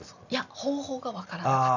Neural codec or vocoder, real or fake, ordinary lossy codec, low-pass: none; real; none; 7.2 kHz